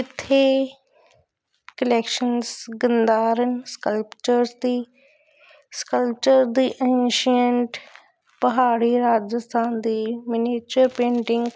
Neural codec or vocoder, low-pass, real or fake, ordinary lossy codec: none; none; real; none